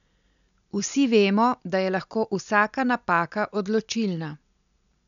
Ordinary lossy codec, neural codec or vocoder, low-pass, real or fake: none; none; 7.2 kHz; real